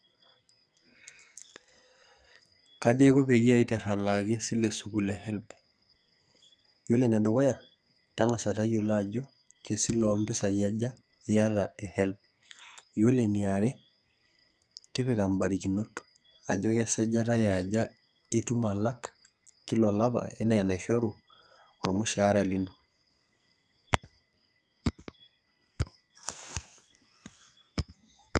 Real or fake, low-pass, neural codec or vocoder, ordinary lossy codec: fake; 9.9 kHz; codec, 44.1 kHz, 2.6 kbps, SNAC; none